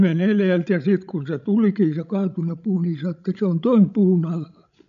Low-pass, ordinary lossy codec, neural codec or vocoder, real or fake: 7.2 kHz; none; codec, 16 kHz, 16 kbps, FreqCodec, larger model; fake